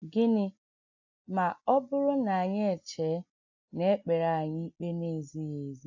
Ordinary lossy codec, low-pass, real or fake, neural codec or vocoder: AAC, 48 kbps; 7.2 kHz; real; none